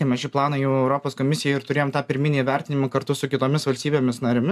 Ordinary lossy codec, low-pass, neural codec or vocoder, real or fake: MP3, 96 kbps; 14.4 kHz; none; real